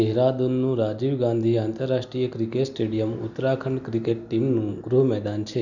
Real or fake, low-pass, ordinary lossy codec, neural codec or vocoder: real; 7.2 kHz; none; none